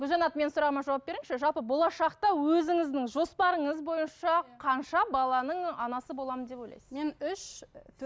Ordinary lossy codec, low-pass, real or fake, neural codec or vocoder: none; none; real; none